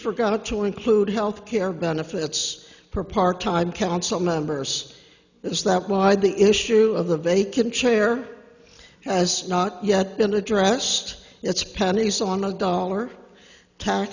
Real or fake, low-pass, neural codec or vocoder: real; 7.2 kHz; none